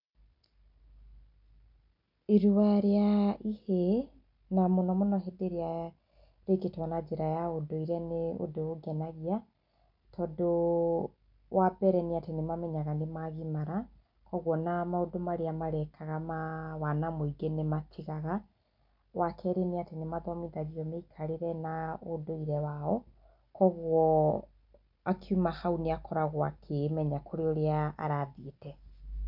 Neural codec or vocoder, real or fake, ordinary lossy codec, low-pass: none; real; none; 5.4 kHz